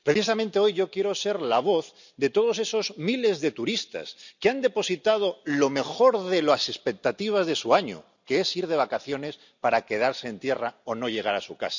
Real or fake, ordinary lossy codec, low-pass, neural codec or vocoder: real; none; 7.2 kHz; none